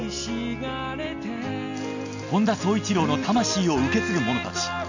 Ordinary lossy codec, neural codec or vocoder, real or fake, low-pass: none; none; real; 7.2 kHz